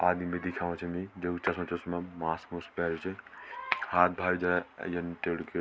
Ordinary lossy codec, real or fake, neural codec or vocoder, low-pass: none; real; none; none